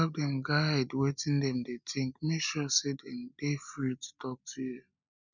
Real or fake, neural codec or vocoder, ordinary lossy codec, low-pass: real; none; none; 7.2 kHz